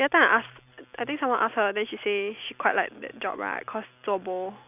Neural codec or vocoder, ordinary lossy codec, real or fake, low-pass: none; none; real; 3.6 kHz